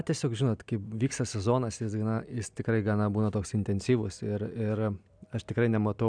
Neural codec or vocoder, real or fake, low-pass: none; real; 9.9 kHz